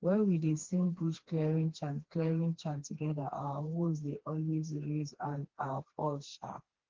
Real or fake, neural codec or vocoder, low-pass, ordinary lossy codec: fake; codec, 16 kHz, 2 kbps, FreqCodec, smaller model; 7.2 kHz; Opus, 16 kbps